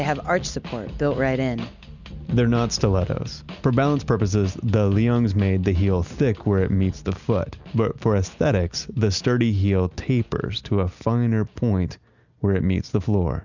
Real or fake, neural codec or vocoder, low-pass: real; none; 7.2 kHz